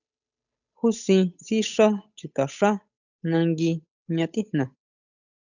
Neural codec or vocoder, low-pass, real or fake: codec, 16 kHz, 8 kbps, FunCodec, trained on Chinese and English, 25 frames a second; 7.2 kHz; fake